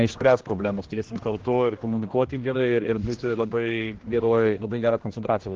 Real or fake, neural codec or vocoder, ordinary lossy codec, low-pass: fake; codec, 16 kHz, 1 kbps, X-Codec, HuBERT features, trained on general audio; Opus, 16 kbps; 7.2 kHz